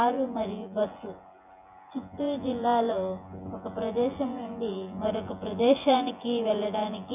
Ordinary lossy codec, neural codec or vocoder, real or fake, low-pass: none; vocoder, 24 kHz, 100 mel bands, Vocos; fake; 3.6 kHz